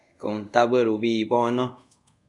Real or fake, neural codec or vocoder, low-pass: fake; codec, 24 kHz, 0.5 kbps, DualCodec; 10.8 kHz